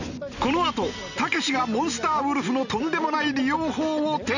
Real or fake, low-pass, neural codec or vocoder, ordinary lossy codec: real; 7.2 kHz; none; none